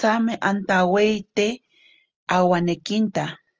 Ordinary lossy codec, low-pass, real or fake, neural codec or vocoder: Opus, 24 kbps; 7.2 kHz; real; none